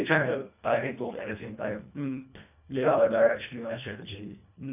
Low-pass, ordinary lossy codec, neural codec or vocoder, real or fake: 3.6 kHz; none; codec, 24 kHz, 1.5 kbps, HILCodec; fake